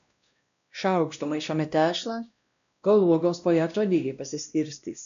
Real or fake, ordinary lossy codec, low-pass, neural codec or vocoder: fake; AAC, 96 kbps; 7.2 kHz; codec, 16 kHz, 1 kbps, X-Codec, WavLM features, trained on Multilingual LibriSpeech